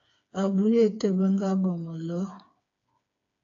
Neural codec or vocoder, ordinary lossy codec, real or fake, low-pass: codec, 16 kHz, 4 kbps, FreqCodec, smaller model; AAC, 64 kbps; fake; 7.2 kHz